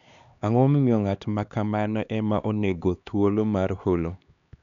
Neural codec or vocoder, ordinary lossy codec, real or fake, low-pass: codec, 16 kHz, 2 kbps, X-Codec, HuBERT features, trained on LibriSpeech; none; fake; 7.2 kHz